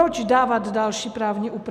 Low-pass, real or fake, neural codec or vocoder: 14.4 kHz; real; none